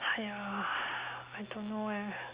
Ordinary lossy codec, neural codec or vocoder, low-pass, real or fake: Opus, 64 kbps; none; 3.6 kHz; real